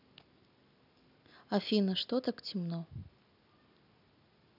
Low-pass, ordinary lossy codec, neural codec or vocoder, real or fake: 5.4 kHz; none; none; real